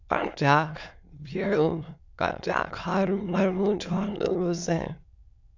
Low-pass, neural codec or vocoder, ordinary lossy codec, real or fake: 7.2 kHz; autoencoder, 22.05 kHz, a latent of 192 numbers a frame, VITS, trained on many speakers; MP3, 64 kbps; fake